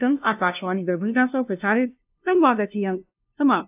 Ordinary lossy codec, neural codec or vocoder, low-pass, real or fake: AAC, 32 kbps; codec, 16 kHz, 0.5 kbps, FunCodec, trained on LibriTTS, 25 frames a second; 3.6 kHz; fake